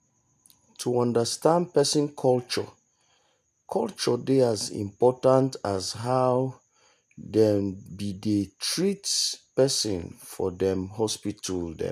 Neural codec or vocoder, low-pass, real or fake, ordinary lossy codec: none; 14.4 kHz; real; none